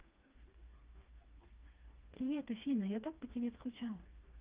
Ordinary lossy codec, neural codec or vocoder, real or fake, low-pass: Opus, 24 kbps; codec, 16 kHz, 2 kbps, FreqCodec, smaller model; fake; 3.6 kHz